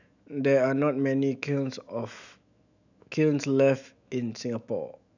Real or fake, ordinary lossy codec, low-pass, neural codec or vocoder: fake; none; 7.2 kHz; autoencoder, 48 kHz, 128 numbers a frame, DAC-VAE, trained on Japanese speech